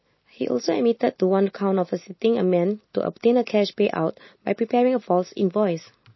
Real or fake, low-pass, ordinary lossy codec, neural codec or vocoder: real; 7.2 kHz; MP3, 24 kbps; none